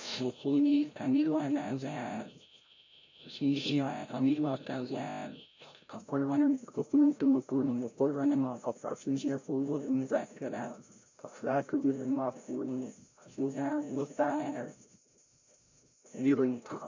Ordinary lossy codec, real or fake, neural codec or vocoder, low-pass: MP3, 48 kbps; fake; codec, 16 kHz, 0.5 kbps, FreqCodec, larger model; 7.2 kHz